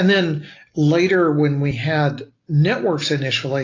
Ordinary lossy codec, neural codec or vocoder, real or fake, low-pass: AAC, 32 kbps; none; real; 7.2 kHz